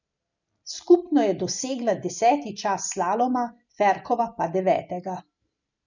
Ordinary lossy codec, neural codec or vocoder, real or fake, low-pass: none; none; real; 7.2 kHz